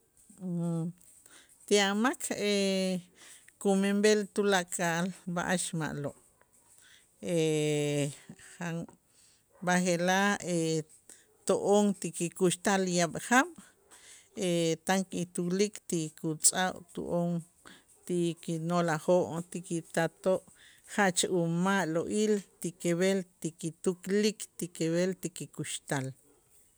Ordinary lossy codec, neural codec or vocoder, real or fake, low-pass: none; none; real; none